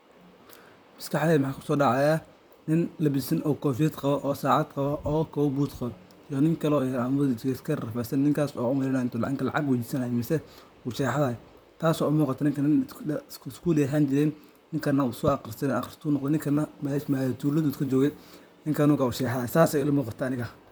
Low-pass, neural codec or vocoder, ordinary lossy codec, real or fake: none; vocoder, 44.1 kHz, 128 mel bands, Pupu-Vocoder; none; fake